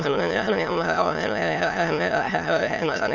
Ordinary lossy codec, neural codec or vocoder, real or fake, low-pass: none; autoencoder, 22.05 kHz, a latent of 192 numbers a frame, VITS, trained on many speakers; fake; 7.2 kHz